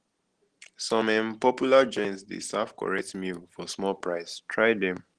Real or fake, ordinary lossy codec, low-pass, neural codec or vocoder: real; Opus, 16 kbps; 9.9 kHz; none